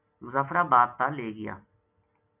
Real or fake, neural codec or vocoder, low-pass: real; none; 3.6 kHz